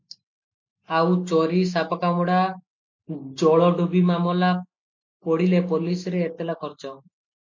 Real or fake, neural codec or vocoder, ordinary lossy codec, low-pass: real; none; MP3, 48 kbps; 7.2 kHz